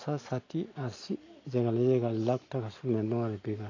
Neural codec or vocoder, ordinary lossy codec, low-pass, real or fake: none; AAC, 32 kbps; 7.2 kHz; real